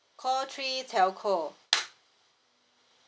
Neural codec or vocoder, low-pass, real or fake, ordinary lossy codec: none; none; real; none